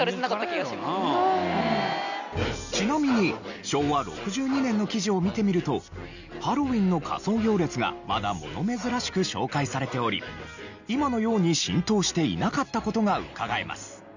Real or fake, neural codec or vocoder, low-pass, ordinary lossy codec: real; none; 7.2 kHz; none